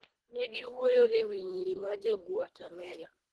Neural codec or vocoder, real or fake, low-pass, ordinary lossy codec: codec, 24 kHz, 1.5 kbps, HILCodec; fake; 10.8 kHz; Opus, 16 kbps